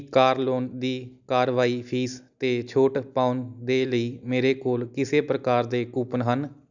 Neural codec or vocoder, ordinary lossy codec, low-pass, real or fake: none; none; 7.2 kHz; real